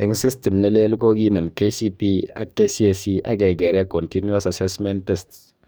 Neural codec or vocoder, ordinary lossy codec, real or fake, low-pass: codec, 44.1 kHz, 2.6 kbps, DAC; none; fake; none